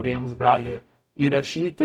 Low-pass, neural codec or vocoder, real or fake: 19.8 kHz; codec, 44.1 kHz, 0.9 kbps, DAC; fake